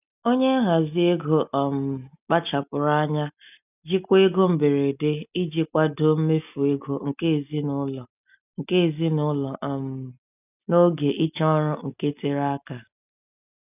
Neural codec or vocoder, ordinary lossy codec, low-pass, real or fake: none; none; 3.6 kHz; real